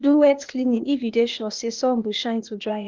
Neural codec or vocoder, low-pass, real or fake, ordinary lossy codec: codec, 16 kHz, 0.8 kbps, ZipCodec; 7.2 kHz; fake; Opus, 32 kbps